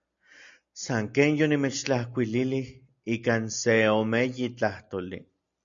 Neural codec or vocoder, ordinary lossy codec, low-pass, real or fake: none; MP3, 64 kbps; 7.2 kHz; real